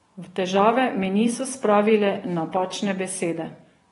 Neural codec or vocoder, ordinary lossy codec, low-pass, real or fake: vocoder, 24 kHz, 100 mel bands, Vocos; AAC, 32 kbps; 10.8 kHz; fake